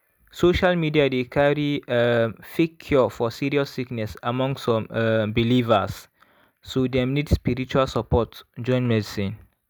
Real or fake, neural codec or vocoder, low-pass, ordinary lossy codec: real; none; none; none